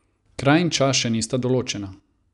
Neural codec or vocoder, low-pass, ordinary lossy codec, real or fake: none; 10.8 kHz; none; real